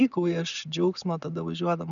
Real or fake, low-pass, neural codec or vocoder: real; 7.2 kHz; none